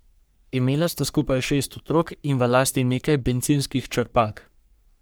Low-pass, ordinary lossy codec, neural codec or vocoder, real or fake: none; none; codec, 44.1 kHz, 3.4 kbps, Pupu-Codec; fake